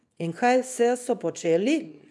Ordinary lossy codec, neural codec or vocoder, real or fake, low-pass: none; codec, 24 kHz, 0.9 kbps, WavTokenizer, small release; fake; none